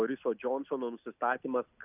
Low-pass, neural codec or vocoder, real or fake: 3.6 kHz; none; real